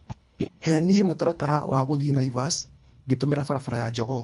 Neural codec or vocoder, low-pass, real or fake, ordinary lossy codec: codec, 24 kHz, 1.5 kbps, HILCodec; 10.8 kHz; fake; none